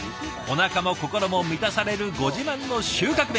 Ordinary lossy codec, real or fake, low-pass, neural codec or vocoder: none; real; none; none